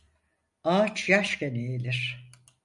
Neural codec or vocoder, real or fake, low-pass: none; real; 10.8 kHz